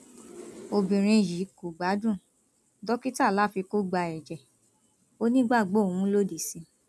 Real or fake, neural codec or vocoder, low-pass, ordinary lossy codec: real; none; none; none